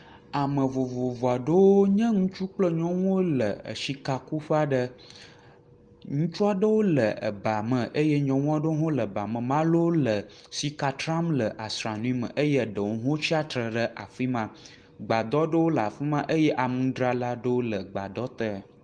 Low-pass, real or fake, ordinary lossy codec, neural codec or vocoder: 9.9 kHz; real; Opus, 24 kbps; none